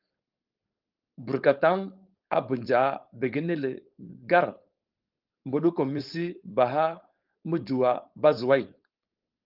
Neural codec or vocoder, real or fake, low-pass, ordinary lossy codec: codec, 16 kHz, 4.8 kbps, FACodec; fake; 5.4 kHz; Opus, 32 kbps